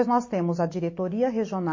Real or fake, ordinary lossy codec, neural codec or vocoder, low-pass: real; MP3, 32 kbps; none; 7.2 kHz